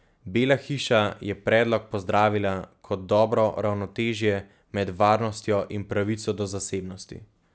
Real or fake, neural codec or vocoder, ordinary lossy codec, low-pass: real; none; none; none